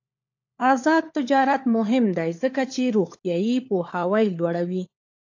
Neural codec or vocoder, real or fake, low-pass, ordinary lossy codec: codec, 16 kHz, 4 kbps, FunCodec, trained on LibriTTS, 50 frames a second; fake; 7.2 kHz; AAC, 48 kbps